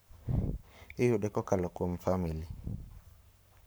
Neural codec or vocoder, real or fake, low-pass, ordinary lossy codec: codec, 44.1 kHz, 7.8 kbps, Pupu-Codec; fake; none; none